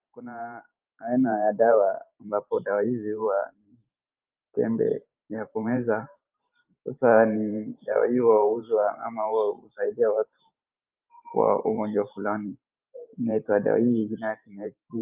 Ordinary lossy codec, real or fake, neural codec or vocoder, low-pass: Opus, 24 kbps; fake; vocoder, 44.1 kHz, 128 mel bands every 512 samples, BigVGAN v2; 3.6 kHz